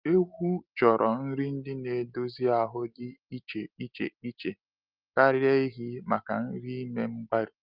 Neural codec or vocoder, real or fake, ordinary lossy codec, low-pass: none; real; Opus, 32 kbps; 5.4 kHz